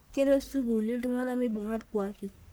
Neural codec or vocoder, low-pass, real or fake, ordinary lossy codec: codec, 44.1 kHz, 1.7 kbps, Pupu-Codec; none; fake; none